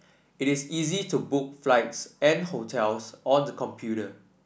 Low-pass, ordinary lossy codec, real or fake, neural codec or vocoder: none; none; real; none